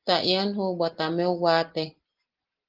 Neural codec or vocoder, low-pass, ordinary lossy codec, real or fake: none; 5.4 kHz; Opus, 16 kbps; real